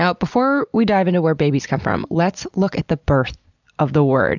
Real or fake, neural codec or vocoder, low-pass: real; none; 7.2 kHz